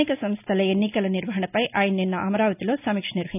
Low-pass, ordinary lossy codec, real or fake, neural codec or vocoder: 3.6 kHz; none; real; none